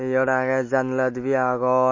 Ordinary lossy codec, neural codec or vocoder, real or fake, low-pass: MP3, 32 kbps; none; real; 7.2 kHz